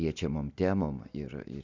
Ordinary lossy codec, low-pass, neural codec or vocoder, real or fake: Opus, 64 kbps; 7.2 kHz; none; real